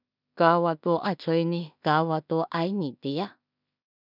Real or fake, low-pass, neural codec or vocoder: fake; 5.4 kHz; codec, 16 kHz in and 24 kHz out, 0.4 kbps, LongCat-Audio-Codec, two codebook decoder